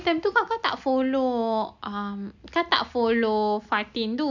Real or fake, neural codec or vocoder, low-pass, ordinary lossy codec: real; none; 7.2 kHz; none